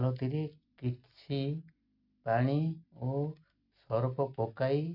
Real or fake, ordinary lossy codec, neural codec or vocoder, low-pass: real; none; none; 5.4 kHz